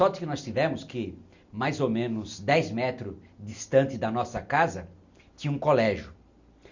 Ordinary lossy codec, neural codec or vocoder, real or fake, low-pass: Opus, 64 kbps; none; real; 7.2 kHz